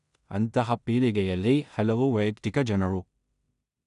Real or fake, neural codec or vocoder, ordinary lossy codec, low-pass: fake; codec, 16 kHz in and 24 kHz out, 0.4 kbps, LongCat-Audio-Codec, two codebook decoder; none; 10.8 kHz